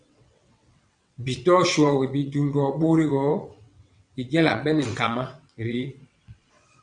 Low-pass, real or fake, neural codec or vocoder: 9.9 kHz; fake; vocoder, 22.05 kHz, 80 mel bands, WaveNeXt